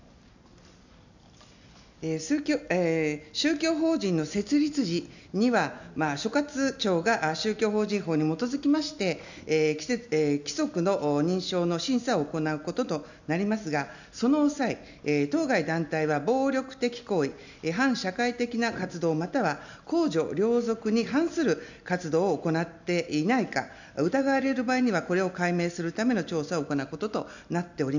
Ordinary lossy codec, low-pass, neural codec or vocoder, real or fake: none; 7.2 kHz; none; real